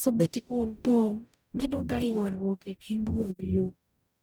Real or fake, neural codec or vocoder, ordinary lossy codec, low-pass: fake; codec, 44.1 kHz, 0.9 kbps, DAC; none; none